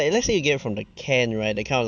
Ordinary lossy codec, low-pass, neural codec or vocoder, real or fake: none; none; none; real